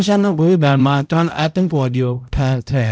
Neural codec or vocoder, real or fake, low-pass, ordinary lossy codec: codec, 16 kHz, 0.5 kbps, X-Codec, HuBERT features, trained on balanced general audio; fake; none; none